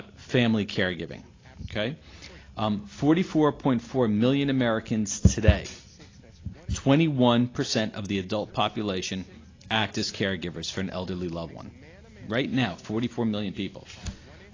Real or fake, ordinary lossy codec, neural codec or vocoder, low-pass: real; AAC, 32 kbps; none; 7.2 kHz